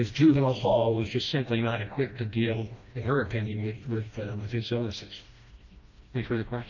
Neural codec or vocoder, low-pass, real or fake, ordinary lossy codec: codec, 16 kHz, 1 kbps, FreqCodec, smaller model; 7.2 kHz; fake; AAC, 48 kbps